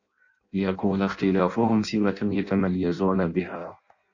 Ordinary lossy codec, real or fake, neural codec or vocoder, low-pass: Opus, 64 kbps; fake; codec, 16 kHz in and 24 kHz out, 0.6 kbps, FireRedTTS-2 codec; 7.2 kHz